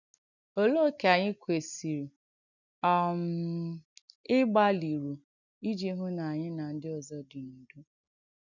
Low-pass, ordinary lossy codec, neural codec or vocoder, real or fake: 7.2 kHz; none; none; real